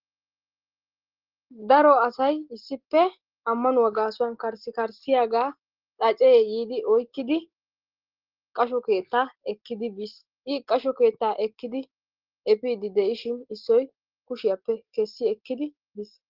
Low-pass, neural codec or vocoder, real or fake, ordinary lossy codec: 5.4 kHz; none; real; Opus, 16 kbps